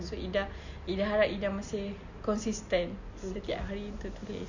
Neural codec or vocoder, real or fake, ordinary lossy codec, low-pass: none; real; none; 7.2 kHz